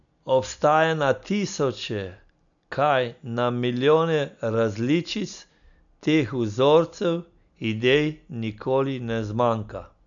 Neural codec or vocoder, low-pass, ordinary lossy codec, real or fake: none; 7.2 kHz; none; real